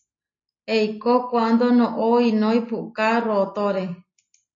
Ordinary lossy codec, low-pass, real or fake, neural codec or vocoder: AAC, 32 kbps; 7.2 kHz; real; none